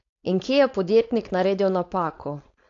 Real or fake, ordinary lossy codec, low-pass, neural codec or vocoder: fake; AAC, 48 kbps; 7.2 kHz; codec, 16 kHz, 4.8 kbps, FACodec